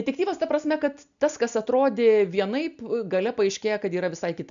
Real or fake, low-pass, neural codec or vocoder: real; 7.2 kHz; none